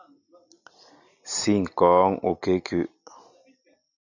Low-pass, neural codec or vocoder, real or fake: 7.2 kHz; none; real